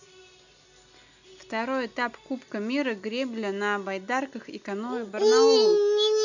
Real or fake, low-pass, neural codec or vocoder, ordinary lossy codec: real; 7.2 kHz; none; none